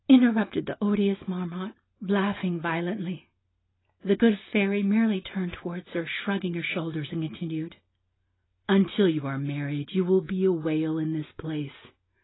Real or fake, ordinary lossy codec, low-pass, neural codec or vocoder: real; AAC, 16 kbps; 7.2 kHz; none